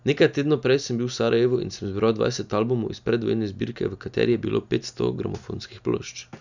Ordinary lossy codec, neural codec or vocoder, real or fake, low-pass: none; none; real; 7.2 kHz